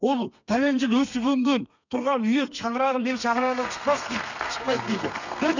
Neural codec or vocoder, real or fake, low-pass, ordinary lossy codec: codec, 32 kHz, 1.9 kbps, SNAC; fake; 7.2 kHz; none